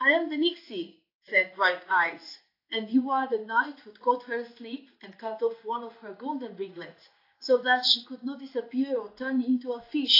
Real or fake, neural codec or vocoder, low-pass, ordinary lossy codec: fake; codec, 16 kHz in and 24 kHz out, 1 kbps, XY-Tokenizer; 5.4 kHz; AAC, 32 kbps